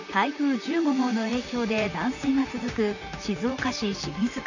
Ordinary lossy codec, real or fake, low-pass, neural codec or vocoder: none; fake; 7.2 kHz; vocoder, 44.1 kHz, 128 mel bands, Pupu-Vocoder